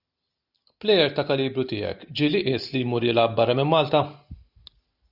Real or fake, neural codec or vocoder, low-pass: real; none; 5.4 kHz